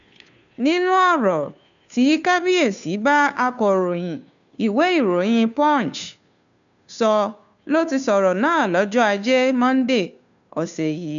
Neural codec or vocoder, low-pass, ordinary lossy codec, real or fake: codec, 16 kHz, 2 kbps, FunCodec, trained on Chinese and English, 25 frames a second; 7.2 kHz; none; fake